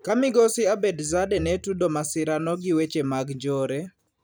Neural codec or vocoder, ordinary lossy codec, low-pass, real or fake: vocoder, 44.1 kHz, 128 mel bands every 256 samples, BigVGAN v2; none; none; fake